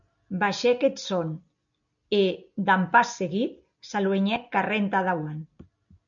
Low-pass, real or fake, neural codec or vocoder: 7.2 kHz; real; none